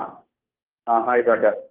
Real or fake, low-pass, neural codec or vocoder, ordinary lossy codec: fake; 3.6 kHz; codec, 16 kHz, 2 kbps, FunCodec, trained on Chinese and English, 25 frames a second; Opus, 16 kbps